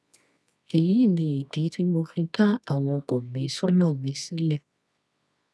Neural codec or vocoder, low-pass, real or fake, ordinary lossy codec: codec, 24 kHz, 0.9 kbps, WavTokenizer, medium music audio release; none; fake; none